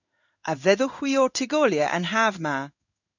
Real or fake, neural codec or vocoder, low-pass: fake; codec, 16 kHz in and 24 kHz out, 1 kbps, XY-Tokenizer; 7.2 kHz